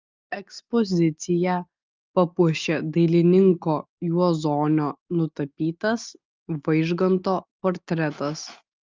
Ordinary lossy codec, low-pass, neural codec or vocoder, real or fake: Opus, 24 kbps; 7.2 kHz; none; real